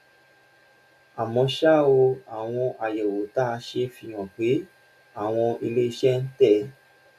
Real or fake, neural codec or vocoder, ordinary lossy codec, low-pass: real; none; none; 14.4 kHz